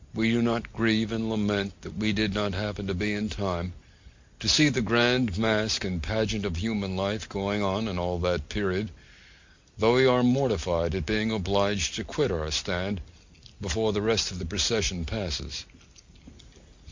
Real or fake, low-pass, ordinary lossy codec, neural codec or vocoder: real; 7.2 kHz; MP3, 48 kbps; none